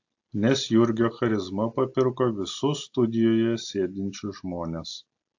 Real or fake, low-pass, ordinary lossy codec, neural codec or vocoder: real; 7.2 kHz; AAC, 48 kbps; none